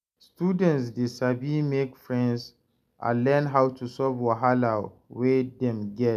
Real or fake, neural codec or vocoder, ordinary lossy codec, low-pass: real; none; none; 14.4 kHz